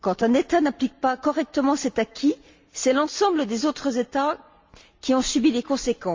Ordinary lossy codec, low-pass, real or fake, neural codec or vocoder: Opus, 32 kbps; 7.2 kHz; real; none